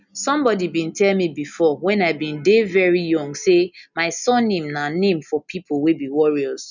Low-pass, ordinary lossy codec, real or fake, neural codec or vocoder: 7.2 kHz; none; real; none